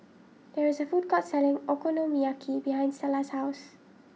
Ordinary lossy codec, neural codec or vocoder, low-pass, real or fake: none; none; none; real